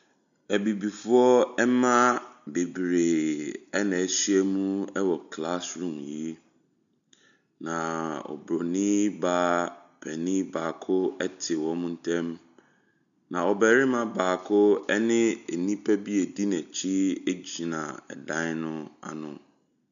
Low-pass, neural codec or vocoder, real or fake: 7.2 kHz; none; real